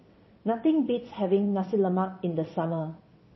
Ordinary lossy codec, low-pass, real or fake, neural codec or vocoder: MP3, 24 kbps; 7.2 kHz; fake; vocoder, 22.05 kHz, 80 mel bands, WaveNeXt